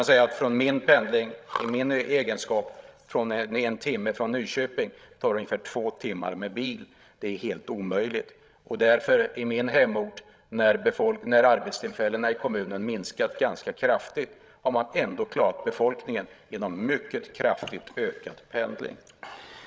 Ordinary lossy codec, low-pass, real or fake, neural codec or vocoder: none; none; fake; codec, 16 kHz, 16 kbps, FreqCodec, larger model